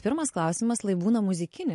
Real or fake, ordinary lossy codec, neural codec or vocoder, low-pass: real; MP3, 48 kbps; none; 14.4 kHz